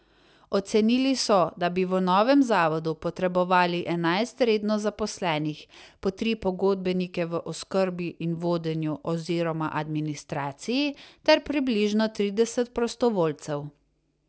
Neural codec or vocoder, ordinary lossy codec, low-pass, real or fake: none; none; none; real